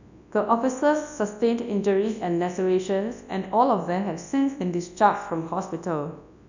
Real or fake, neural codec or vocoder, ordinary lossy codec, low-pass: fake; codec, 24 kHz, 0.9 kbps, WavTokenizer, large speech release; none; 7.2 kHz